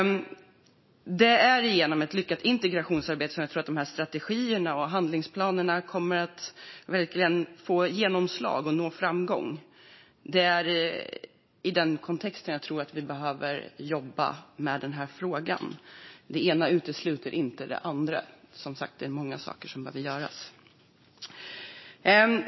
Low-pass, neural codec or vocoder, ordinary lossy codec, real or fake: 7.2 kHz; none; MP3, 24 kbps; real